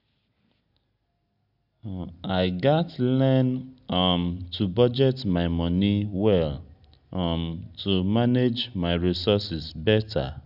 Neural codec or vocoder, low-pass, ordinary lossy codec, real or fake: none; 5.4 kHz; none; real